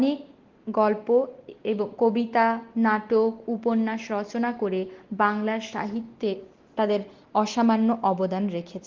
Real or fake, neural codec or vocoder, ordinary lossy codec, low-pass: real; none; Opus, 16 kbps; 7.2 kHz